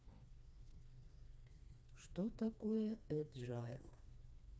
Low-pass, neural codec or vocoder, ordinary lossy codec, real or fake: none; codec, 16 kHz, 2 kbps, FreqCodec, smaller model; none; fake